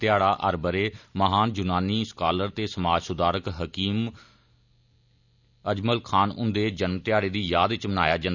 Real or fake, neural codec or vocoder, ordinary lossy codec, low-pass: real; none; none; 7.2 kHz